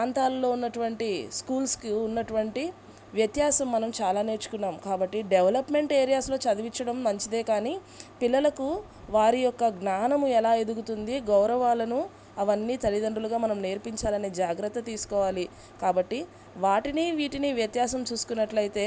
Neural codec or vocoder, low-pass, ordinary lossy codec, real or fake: none; none; none; real